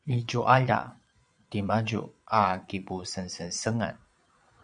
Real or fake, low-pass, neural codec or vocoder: fake; 9.9 kHz; vocoder, 22.05 kHz, 80 mel bands, Vocos